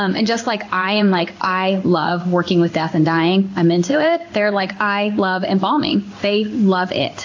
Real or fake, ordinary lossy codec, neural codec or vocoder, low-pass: fake; AAC, 48 kbps; codec, 16 kHz in and 24 kHz out, 1 kbps, XY-Tokenizer; 7.2 kHz